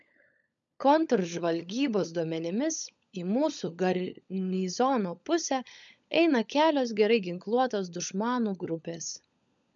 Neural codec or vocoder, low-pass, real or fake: codec, 16 kHz, 16 kbps, FunCodec, trained on LibriTTS, 50 frames a second; 7.2 kHz; fake